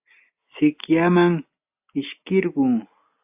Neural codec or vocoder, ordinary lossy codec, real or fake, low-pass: none; AAC, 32 kbps; real; 3.6 kHz